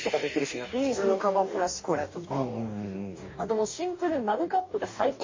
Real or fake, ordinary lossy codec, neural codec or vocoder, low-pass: fake; MP3, 32 kbps; codec, 44.1 kHz, 2.6 kbps, DAC; 7.2 kHz